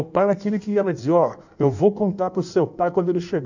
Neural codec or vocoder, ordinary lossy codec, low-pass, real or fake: codec, 16 kHz in and 24 kHz out, 1.1 kbps, FireRedTTS-2 codec; none; 7.2 kHz; fake